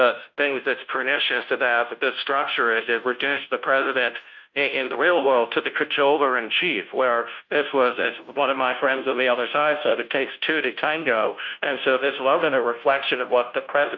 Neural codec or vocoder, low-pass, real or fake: codec, 16 kHz, 0.5 kbps, FunCodec, trained on Chinese and English, 25 frames a second; 7.2 kHz; fake